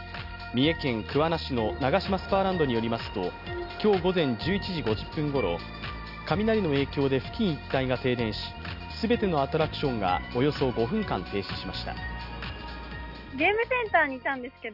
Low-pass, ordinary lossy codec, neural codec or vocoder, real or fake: 5.4 kHz; none; none; real